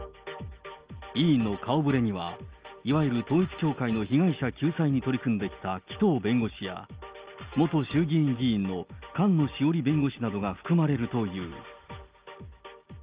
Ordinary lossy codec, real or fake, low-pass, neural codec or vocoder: Opus, 24 kbps; real; 3.6 kHz; none